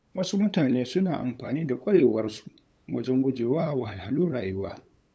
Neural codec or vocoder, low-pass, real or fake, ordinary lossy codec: codec, 16 kHz, 8 kbps, FunCodec, trained on LibriTTS, 25 frames a second; none; fake; none